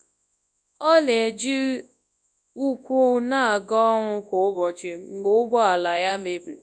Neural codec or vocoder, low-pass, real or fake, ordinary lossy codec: codec, 24 kHz, 0.9 kbps, WavTokenizer, large speech release; 9.9 kHz; fake; none